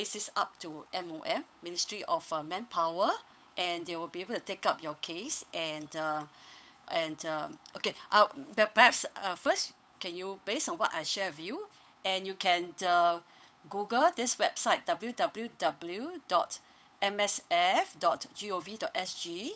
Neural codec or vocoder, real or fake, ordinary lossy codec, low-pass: codec, 16 kHz, 8 kbps, FunCodec, trained on Chinese and English, 25 frames a second; fake; none; none